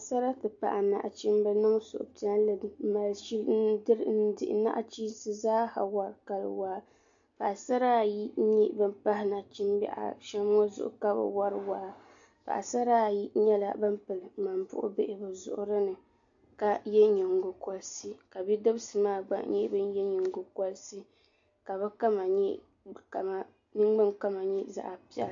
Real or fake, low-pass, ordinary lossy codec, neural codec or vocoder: real; 7.2 kHz; AAC, 64 kbps; none